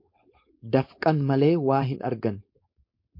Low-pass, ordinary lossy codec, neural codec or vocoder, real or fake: 5.4 kHz; MP3, 32 kbps; codec, 16 kHz, 4.8 kbps, FACodec; fake